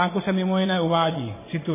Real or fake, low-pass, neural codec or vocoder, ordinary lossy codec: fake; 3.6 kHz; vocoder, 24 kHz, 100 mel bands, Vocos; MP3, 16 kbps